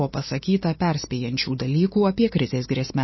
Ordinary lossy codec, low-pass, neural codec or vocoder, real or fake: MP3, 24 kbps; 7.2 kHz; none; real